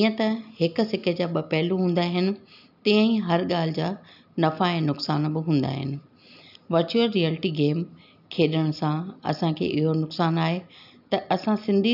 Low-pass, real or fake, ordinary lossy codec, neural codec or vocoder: 5.4 kHz; real; none; none